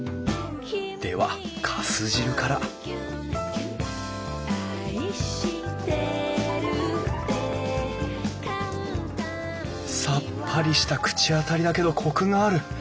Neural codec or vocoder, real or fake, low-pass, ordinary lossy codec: none; real; none; none